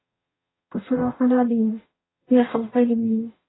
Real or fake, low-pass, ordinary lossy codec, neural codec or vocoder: fake; 7.2 kHz; AAC, 16 kbps; codec, 44.1 kHz, 0.9 kbps, DAC